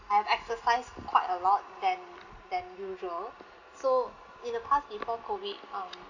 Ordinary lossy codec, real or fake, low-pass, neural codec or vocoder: none; real; 7.2 kHz; none